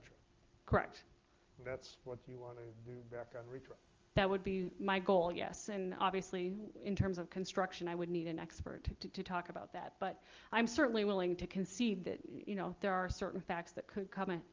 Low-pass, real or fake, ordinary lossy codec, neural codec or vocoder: 7.2 kHz; real; Opus, 32 kbps; none